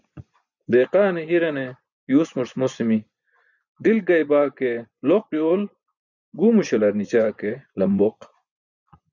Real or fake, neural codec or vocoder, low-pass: fake; vocoder, 24 kHz, 100 mel bands, Vocos; 7.2 kHz